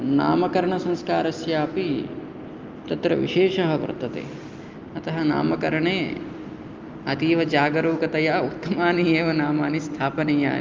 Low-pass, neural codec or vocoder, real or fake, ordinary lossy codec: 7.2 kHz; none; real; Opus, 24 kbps